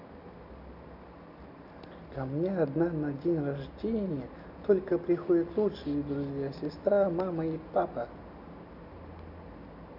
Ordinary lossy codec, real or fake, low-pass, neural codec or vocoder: none; real; 5.4 kHz; none